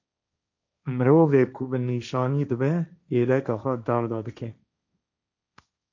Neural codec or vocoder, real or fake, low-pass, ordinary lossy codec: codec, 16 kHz, 1.1 kbps, Voila-Tokenizer; fake; 7.2 kHz; MP3, 64 kbps